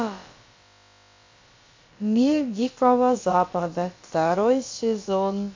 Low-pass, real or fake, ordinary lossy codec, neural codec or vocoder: 7.2 kHz; fake; MP3, 48 kbps; codec, 16 kHz, about 1 kbps, DyCAST, with the encoder's durations